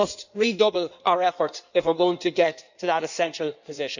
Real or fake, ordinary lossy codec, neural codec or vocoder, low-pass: fake; none; codec, 16 kHz in and 24 kHz out, 1.1 kbps, FireRedTTS-2 codec; 7.2 kHz